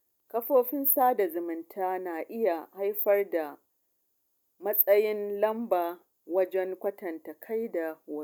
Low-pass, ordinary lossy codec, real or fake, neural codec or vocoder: 19.8 kHz; none; real; none